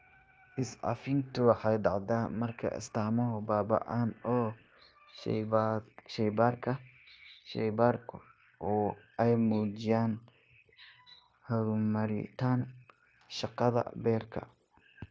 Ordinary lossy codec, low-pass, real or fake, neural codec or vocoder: none; none; fake; codec, 16 kHz, 0.9 kbps, LongCat-Audio-Codec